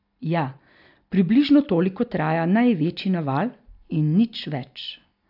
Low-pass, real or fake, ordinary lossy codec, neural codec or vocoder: 5.4 kHz; real; AAC, 48 kbps; none